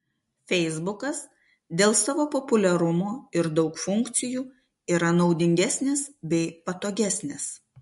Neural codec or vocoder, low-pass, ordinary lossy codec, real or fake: none; 14.4 kHz; MP3, 48 kbps; real